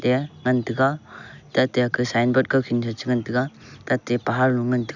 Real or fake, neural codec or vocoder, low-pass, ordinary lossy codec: real; none; 7.2 kHz; none